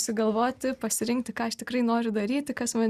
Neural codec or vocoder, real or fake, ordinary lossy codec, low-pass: none; real; Opus, 64 kbps; 14.4 kHz